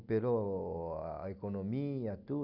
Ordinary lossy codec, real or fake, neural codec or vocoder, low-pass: none; real; none; 5.4 kHz